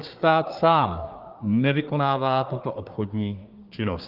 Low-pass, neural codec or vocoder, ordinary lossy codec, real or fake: 5.4 kHz; codec, 24 kHz, 1 kbps, SNAC; Opus, 32 kbps; fake